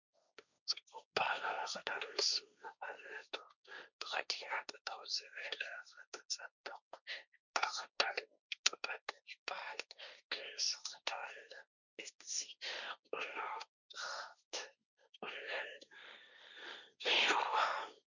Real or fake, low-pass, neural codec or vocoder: fake; 7.2 kHz; codec, 16 kHz, 1.1 kbps, Voila-Tokenizer